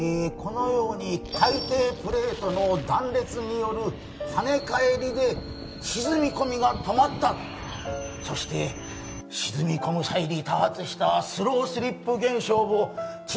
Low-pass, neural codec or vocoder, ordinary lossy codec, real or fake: none; none; none; real